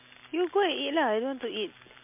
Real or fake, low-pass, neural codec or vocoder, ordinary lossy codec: real; 3.6 kHz; none; MP3, 32 kbps